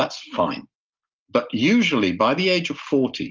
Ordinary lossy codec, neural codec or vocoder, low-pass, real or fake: Opus, 32 kbps; none; 7.2 kHz; real